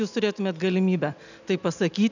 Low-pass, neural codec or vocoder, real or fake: 7.2 kHz; none; real